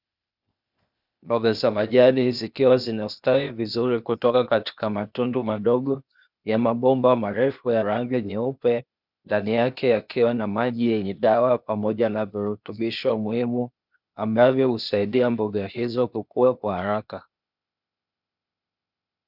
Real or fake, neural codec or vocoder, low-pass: fake; codec, 16 kHz, 0.8 kbps, ZipCodec; 5.4 kHz